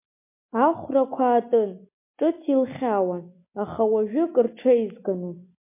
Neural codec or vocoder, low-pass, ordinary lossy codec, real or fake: none; 3.6 kHz; MP3, 24 kbps; real